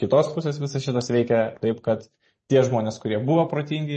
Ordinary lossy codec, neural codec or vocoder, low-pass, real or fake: MP3, 32 kbps; vocoder, 44.1 kHz, 128 mel bands every 512 samples, BigVGAN v2; 10.8 kHz; fake